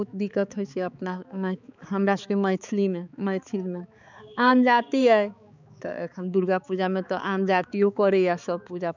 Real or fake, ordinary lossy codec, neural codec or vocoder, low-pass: fake; none; codec, 16 kHz, 4 kbps, X-Codec, HuBERT features, trained on balanced general audio; 7.2 kHz